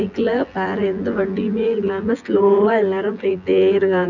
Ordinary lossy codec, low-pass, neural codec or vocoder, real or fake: none; 7.2 kHz; vocoder, 24 kHz, 100 mel bands, Vocos; fake